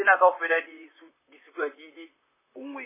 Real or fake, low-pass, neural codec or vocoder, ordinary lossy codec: fake; 3.6 kHz; vocoder, 44.1 kHz, 128 mel bands, Pupu-Vocoder; MP3, 16 kbps